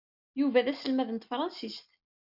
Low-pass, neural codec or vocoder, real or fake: 5.4 kHz; none; real